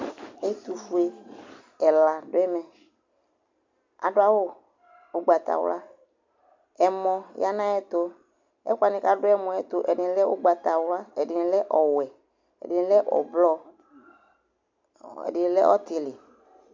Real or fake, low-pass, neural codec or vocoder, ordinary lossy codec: real; 7.2 kHz; none; MP3, 64 kbps